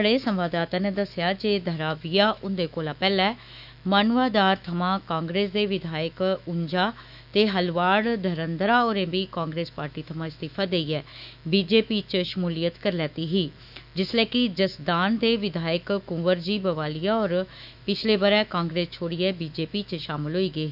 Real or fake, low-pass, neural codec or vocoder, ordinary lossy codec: fake; 5.4 kHz; autoencoder, 48 kHz, 128 numbers a frame, DAC-VAE, trained on Japanese speech; none